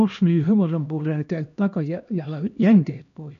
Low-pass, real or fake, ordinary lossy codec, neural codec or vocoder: 7.2 kHz; fake; MP3, 96 kbps; codec, 16 kHz, 1 kbps, X-Codec, HuBERT features, trained on LibriSpeech